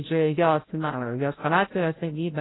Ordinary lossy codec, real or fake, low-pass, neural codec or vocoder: AAC, 16 kbps; fake; 7.2 kHz; codec, 16 kHz, 0.5 kbps, FreqCodec, larger model